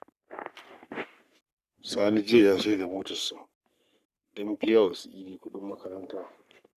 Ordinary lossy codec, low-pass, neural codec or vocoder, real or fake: none; 14.4 kHz; codec, 44.1 kHz, 3.4 kbps, Pupu-Codec; fake